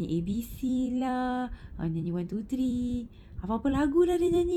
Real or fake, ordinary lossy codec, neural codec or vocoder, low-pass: fake; none; vocoder, 44.1 kHz, 128 mel bands every 256 samples, BigVGAN v2; 19.8 kHz